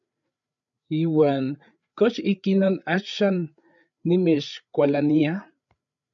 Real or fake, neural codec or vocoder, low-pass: fake; codec, 16 kHz, 8 kbps, FreqCodec, larger model; 7.2 kHz